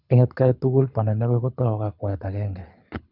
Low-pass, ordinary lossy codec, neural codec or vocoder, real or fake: 5.4 kHz; none; codec, 24 kHz, 6 kbps, HILCodec; fake